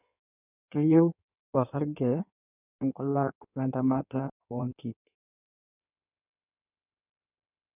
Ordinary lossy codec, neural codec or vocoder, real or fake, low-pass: none; codec, 16 kHz in and 24 kHz out, 1.1 kbps, FireRedTTS-2 codec; fake; 3.6 kHz